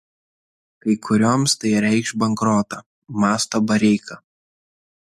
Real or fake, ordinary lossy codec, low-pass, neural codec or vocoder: real; MP3, 64 kbps; 14.4 kHz; none